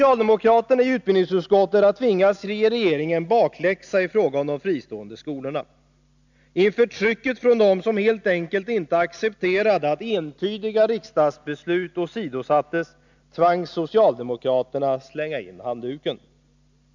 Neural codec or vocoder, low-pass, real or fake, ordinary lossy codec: none; 7.2 kHz; real; none